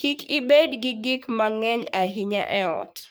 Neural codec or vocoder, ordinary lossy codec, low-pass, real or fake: codec, 44.1 kHz, 3.4 kbps, Pupu-Codec; none; none; fake